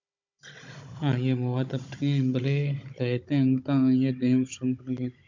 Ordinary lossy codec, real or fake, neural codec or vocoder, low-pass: MP3, 64 kbps; fake; codec, 16 kHz, 16 kbps, FunCodec, trained on Chinese and English, 50 frames a second; 7.2 kHz